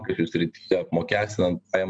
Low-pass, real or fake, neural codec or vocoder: 9.9 kHz; real; none